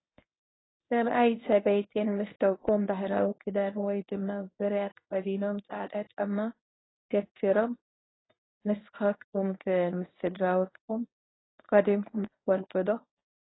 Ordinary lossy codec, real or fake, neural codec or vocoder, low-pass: AAC, 16 kbps; fake; codec, 24 kHz, 0.9 kbps, WavTokenizer, medium speech release version 1; 7.2 kHz